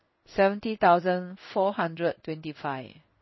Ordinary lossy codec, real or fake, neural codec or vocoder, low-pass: MP3, 24 kbps; fake; codec, 16 kHz in and 24 kHz out, 1 kbps, XY-Tokenizer; 7.2 kHz